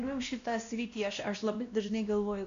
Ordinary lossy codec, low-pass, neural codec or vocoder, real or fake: MP3, 64 kbps; 7.2 kHz; codec, 16 kHz, 1 kbps, X-Codec, WavLM features, trained on Multilingual LibriSpeech; fake